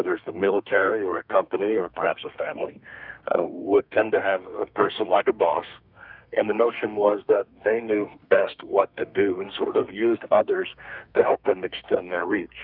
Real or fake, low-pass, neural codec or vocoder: fake; 5.4 kHz; codec, 32 kHz, 1.9 kbps, SNAC